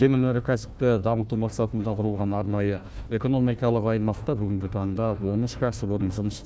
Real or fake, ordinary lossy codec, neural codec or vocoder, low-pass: fake; none; codec, 16 kHz, 1 kbps, FunCodec, trained on Chinese and English, 50 frames a second; none